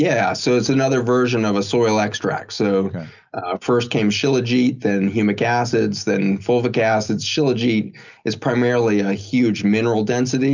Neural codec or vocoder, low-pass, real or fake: none; 7.2 kHz; real